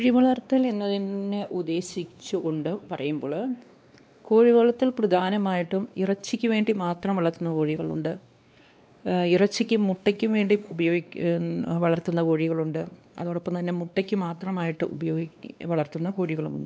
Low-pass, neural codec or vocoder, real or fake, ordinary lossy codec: none; codec, 16 kHz, 2 kbps, X-Codec, WavLM features, trained on Multilingual LibriSpeech; fake; none